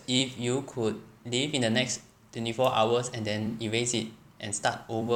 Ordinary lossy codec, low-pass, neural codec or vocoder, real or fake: none; 19.8 kHz; vocoder, 44.1 kHz, 128 mel bands every 256 samples, BigVGAN v2; fake